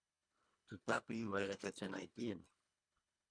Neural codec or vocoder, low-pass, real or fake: codec, 24 kHz, 1.5 kbps, HILCodec; 9.9 kHz; fake